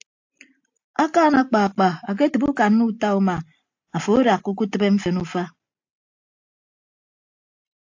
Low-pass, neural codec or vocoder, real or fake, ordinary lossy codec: 7.2 kHz; none; real; AAC, 48 kbps